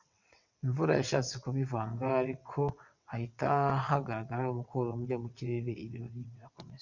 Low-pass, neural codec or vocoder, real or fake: 7.2 kHz; vocoder, 22.05 kHz, 80 mel bands, WaveNeXt; fake